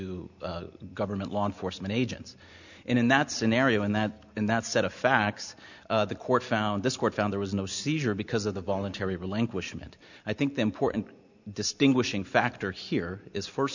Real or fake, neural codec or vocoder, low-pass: real; none; 7.2 kHz